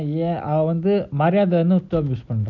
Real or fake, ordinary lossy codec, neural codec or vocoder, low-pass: real; none; none; 7.2 kHz